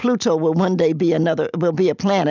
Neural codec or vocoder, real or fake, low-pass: none; real; 7.2 kHz